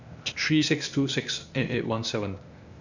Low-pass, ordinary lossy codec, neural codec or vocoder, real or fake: 7.2 kHz; none; codec, 16 kHz, 0.8 kbps, ZipCodec; fake